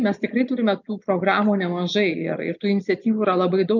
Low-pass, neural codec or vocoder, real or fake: 7.2 kHz; none; real